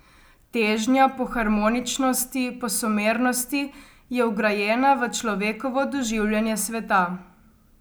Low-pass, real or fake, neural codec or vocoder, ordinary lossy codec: none; real; none; none